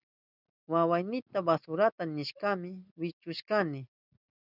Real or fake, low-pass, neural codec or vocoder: real; 5.4 kHz; none